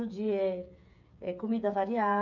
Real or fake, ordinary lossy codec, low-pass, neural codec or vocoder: fake; none; 7.2 kHz; codec, 16 kHz, 8 kbps, FreqCodec, smaller model